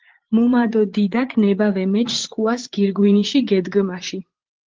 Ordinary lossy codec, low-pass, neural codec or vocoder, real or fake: Opus, 16 kbps; 7.2 kHz; vocoder, 44.1 kHz, 80 mel bands, Vocos; fake